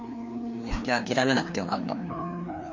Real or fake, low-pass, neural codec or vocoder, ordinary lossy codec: fake; 7.2 kHz; codec, 16 kHz, 2 kbps, FreqCodec, larger model; MP3, 48 kbps